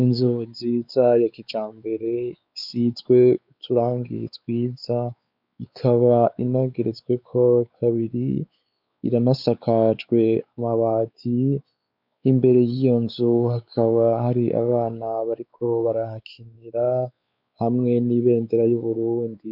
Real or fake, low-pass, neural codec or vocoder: fake; 5.4 kHz; codec, 16 kHz, 4 kbps, X-Codec, WavLM features, trained on Multilingual LibriSpeech